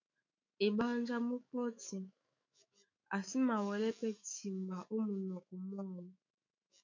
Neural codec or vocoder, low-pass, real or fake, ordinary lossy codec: autoencoder, 48 kHz, 128 numbers a frame, DAC-VAE, trained on Japanese speech; 7.2 kHz; fake; MP3, 64 kbps